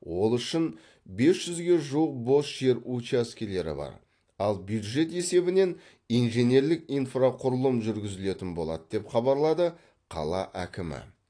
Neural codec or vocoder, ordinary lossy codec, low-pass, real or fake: none; AAC, 48 kbps; 9.9 kHz; real